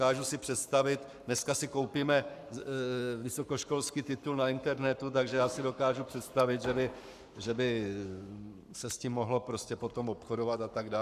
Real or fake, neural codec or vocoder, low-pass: fake; codec, 44.1 kHz, 7.8 kbps, Pupu-Codec; 14.4 kHz